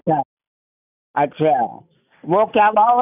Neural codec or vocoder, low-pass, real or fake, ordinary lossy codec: none; 3.6 kHz; real; none